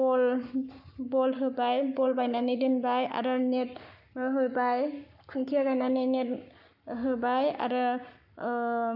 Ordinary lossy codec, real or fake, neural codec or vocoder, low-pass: none; fake; codec, 44.1 kHz, 7.8 kbps, Pupu-Codec; 5.4 kHz